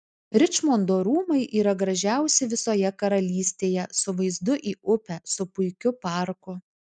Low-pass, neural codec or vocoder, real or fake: 9.9 kHz; none; real